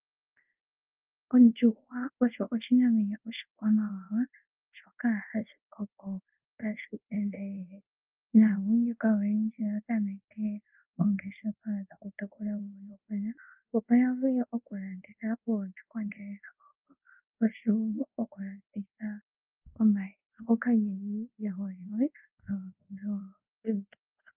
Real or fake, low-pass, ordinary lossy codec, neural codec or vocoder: fake; 3.6 kHz; Opus, 24 kbps; codec, 24 kHz, 0.5 kbps, DualCodec